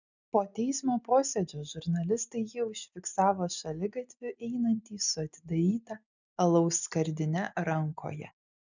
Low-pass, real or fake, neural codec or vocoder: 7.2 kHz; real; none